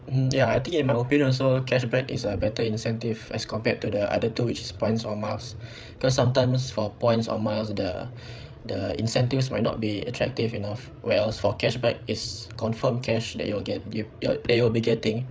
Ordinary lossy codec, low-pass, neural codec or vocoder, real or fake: none; none; codec, 16 kHz, 8 kbps, FreqCodec, larger model; fake